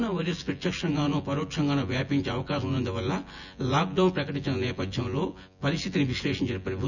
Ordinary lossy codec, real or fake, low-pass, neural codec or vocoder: none; fake; 7.2 kHz; vocoder, 24 kHz, 100 mel bands, Vocos